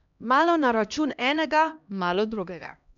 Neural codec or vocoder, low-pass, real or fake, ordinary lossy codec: codec, 16 kHz, 1 kbps, X-Codec, HuBERT features, trained on LibriSpeech; 7.2 kHz; fake; none